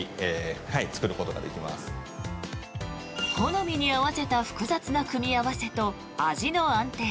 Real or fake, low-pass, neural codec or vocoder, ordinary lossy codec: real; none; none; none